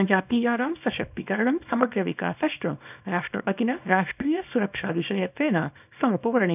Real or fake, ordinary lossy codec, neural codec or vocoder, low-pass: fake; none; codec, 24 kHz, 0.9 kbps, WavTokenizer, small release; 3.6 kHz